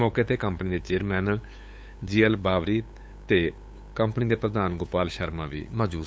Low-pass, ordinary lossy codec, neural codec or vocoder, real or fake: none; none; codec, 16 kHz, 8 kbps, FunCodec, trained on LibriTTS, 25 frames a second; fake